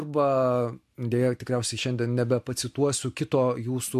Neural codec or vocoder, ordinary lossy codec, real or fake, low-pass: none; MP3, 64 kbps; real; 14.4 kHz